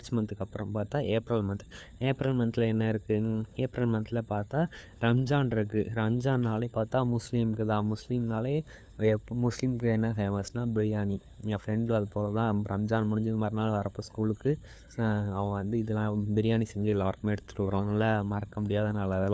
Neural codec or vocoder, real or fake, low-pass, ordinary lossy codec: codec, 16 kHz, 4 kbps, FunCodec, trained on LibriTTS, 50 frames a second; fake; none; none